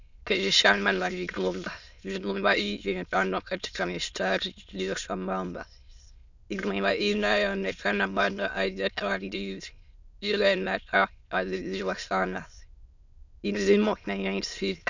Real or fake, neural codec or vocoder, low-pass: fake; autoencoder, 22.05 kHz, a latent of 192 numbers a frame, VITS, trained on many speakers; 7.2 kHz